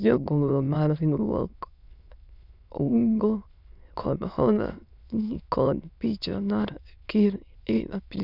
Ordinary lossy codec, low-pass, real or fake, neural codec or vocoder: none; 5.4 kHz; fake; autoencoder, 22.05 kHz, a latent of 192 numbers a frame, VITS, trained on many speakers